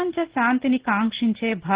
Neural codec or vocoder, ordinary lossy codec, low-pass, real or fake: none; Opus, 16 kbps; 3.6 kHz; real